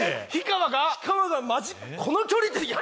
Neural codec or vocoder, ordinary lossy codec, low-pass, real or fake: none; none; none; real